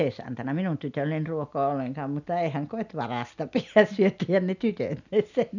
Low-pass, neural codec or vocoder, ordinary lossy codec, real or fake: 7.2 kHz; none; none; real